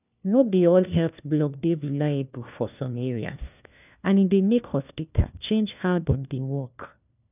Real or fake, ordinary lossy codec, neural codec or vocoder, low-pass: fake; none; codec, 16 kHz, 1 kbps, FunCodec, trained on LibriTTS, 50 frames a second; 3.6 kHz